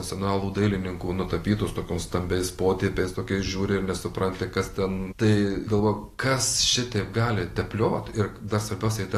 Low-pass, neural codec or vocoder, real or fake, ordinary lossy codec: 14.4 kHz; none; real; AAC, 48 kbps